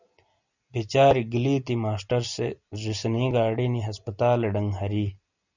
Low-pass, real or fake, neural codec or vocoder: 7.2 kHz; real; none